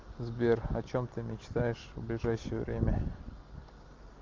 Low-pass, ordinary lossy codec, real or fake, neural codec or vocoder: 7.2 kHz; Opus, 32 kbps; real; none